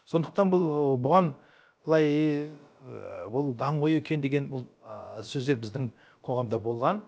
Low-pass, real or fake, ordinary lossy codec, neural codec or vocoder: none; fake; none; codec, 16 kHz, about 1 kbps, DyCAST, with the encoder's durations